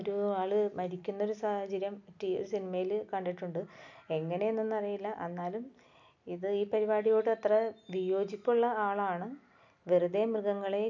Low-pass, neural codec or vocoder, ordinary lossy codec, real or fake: 7.2 kHz; none; none; real